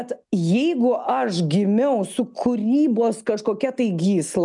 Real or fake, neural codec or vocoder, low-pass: real; none; 10.8 kHz